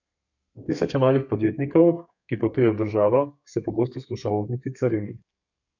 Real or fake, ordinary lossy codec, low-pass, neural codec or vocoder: fake; none; 7.2 kHz; codec, 32 kHz, 1.9 kbps, SNAC